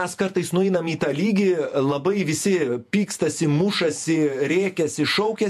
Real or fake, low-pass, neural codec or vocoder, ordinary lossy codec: fake; 14.4 kHz; vocoder, 48 kHz, 128 mel bands, Vocos; MP3, 64 kbps